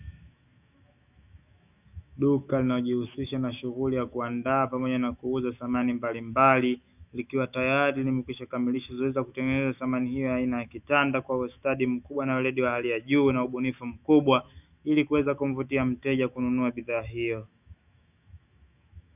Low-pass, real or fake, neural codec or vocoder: 3.6 kHz; fake; autoencoder, 48 kHz, 128 numbers a frame, DAC-VAE, trained on Japanese speech